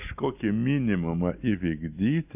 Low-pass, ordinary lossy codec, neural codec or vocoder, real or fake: 3.6 kHz; AAC, 32 kbps; none; real